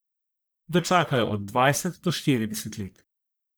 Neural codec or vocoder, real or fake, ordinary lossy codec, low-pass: codec, 44.1 kHz, 1.7 kbps, Pupu-Codec; fake; none; none